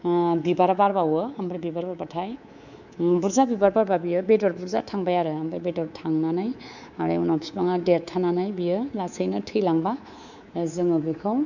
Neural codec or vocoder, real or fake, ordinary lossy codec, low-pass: codec, 24 kHz, 3.1 kbps, DualCodec; fake; none; 7.2 kHz